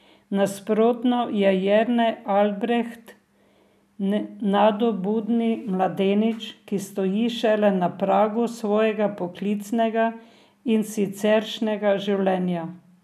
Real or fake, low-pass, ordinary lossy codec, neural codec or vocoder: real; 14.4 kHz; none; none